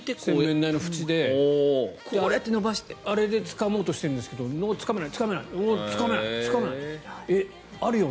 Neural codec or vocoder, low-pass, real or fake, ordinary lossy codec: none; none; real; none